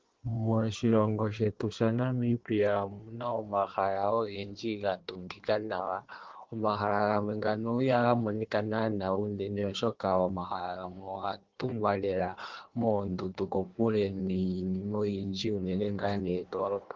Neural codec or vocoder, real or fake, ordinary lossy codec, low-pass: codec, 16 kHz in and 24 kHz out, 1.1 kbps, FireRedTTS-2 codec; fake; Opus, 16 kbps; 7.2 kHz